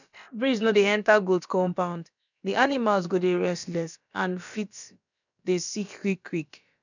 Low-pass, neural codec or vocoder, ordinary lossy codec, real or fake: 7.2 kHz; codec, 16 kHz, about 1 kbps, DyCAST, with the encoder's durations; none; fake